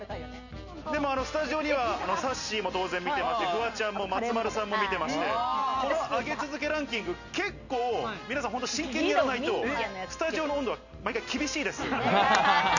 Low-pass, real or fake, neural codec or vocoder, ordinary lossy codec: 7.2 kHz; real; none; none